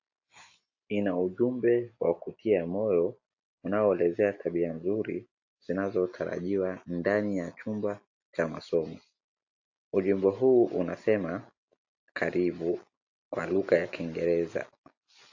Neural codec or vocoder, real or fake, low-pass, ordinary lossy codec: none; real; 7.2 kHz; AAC, 48 kbps